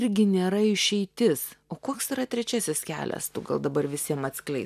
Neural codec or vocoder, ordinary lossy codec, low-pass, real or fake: none; MP3, 96 kbps; 14.4 kHz; real